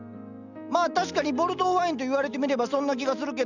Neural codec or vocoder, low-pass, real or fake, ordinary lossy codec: none; 7.2 kHz; real; none